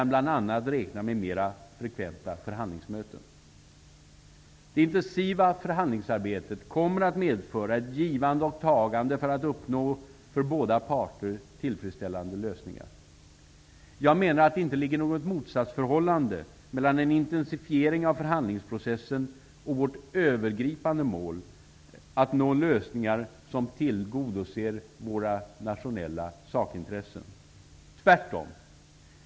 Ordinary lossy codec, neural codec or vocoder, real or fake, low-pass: none; none; real; none